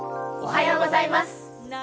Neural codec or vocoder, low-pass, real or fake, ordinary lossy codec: none; none; real; none